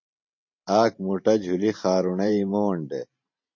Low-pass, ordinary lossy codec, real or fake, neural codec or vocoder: 7.2 kHz; MP3, 32 kbps; fake; vocoder, 44.1 kHz, 128 mel bands every 512 samples, BigVGAN v2